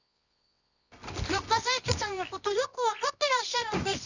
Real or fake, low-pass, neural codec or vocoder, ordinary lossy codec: fake; 7.2 kHz; codec, 24 kHz, 0.9 kbps, WavTokenizer, medium music audio release; none